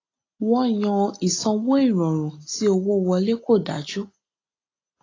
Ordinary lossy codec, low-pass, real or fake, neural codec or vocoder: AAC, 32 kbps; 7.2 kHz; real; none